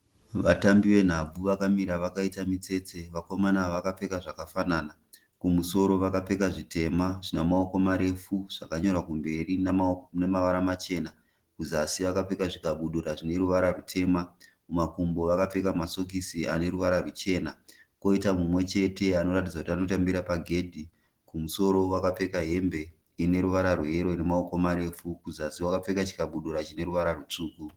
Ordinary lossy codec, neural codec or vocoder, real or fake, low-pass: Opus, 16 kbps; none; real; 19.8 kHz